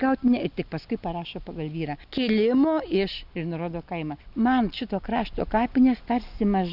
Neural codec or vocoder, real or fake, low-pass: none; real; 5.4 kHz